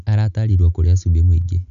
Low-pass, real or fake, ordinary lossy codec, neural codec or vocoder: 7.2 kHz; real; none; none